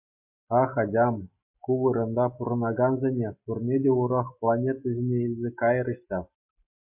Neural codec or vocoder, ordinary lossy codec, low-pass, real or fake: none; MP3, 32 kbps; 3.6 kHz; real